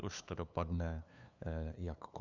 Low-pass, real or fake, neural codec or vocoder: 7.2 kHz; fake; codec, 16 kHz, 2 kbps, FunCodec, trained on LibriTTS, 25 frames a second